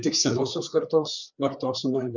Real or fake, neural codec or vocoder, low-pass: fake; codec, 16 kHz, 4 kbps, FreqCodec, larger model; 7.2 kHz